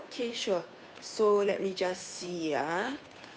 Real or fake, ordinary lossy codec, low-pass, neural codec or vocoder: fake; none; none; codec, 16 kHz, 2 kbps, FunCodec, trained on Chinese and English, 25 frames a second